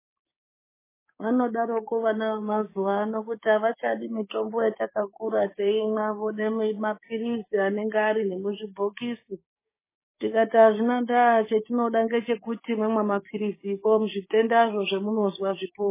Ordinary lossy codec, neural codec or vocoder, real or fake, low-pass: MP3, 16 kbps; codec, 16 kHz, 6 kbps, DAC; fake; 3.6 kHz